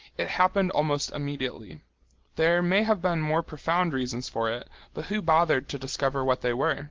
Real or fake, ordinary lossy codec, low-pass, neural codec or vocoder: real; Opus, 16 kbps; 7.2 kHz; none